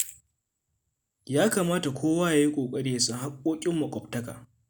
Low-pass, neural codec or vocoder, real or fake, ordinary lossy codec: none; none; real; none